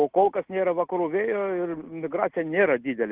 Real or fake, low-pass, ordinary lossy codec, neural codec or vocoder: real; 3.6 kHz; Opus, 16 kbps; none